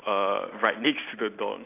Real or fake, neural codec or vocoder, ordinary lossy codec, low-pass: real; none; AAC, 24 kbps; 3.6 kHz